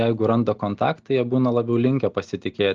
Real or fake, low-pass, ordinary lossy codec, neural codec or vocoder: real; 7.2 kHz; Opus, 32 kbps; none